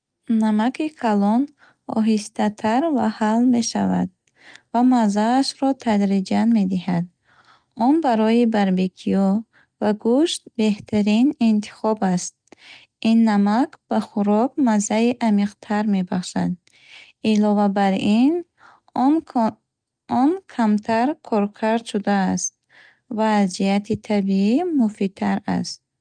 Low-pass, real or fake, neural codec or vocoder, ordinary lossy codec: 9.9 kHz; real; none; Opus, 32 kbps